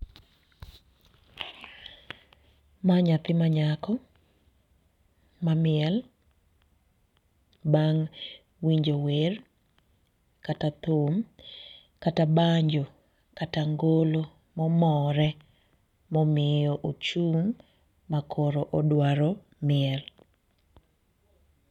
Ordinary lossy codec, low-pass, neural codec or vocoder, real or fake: none; 19.8 kHz; none; real